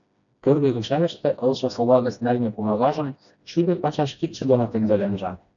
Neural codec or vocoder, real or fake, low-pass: codec, 16 kHz, 1 kbps, FreqCodec, smaller model; fake; 7.2 kHz